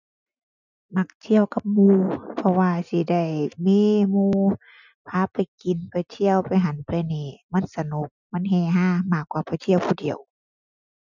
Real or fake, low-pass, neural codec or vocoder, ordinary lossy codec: real; 7.2 kHz; none; none